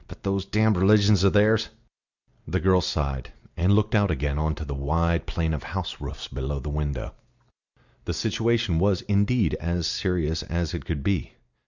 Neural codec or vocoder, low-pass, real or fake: none; 7.2 kHz; real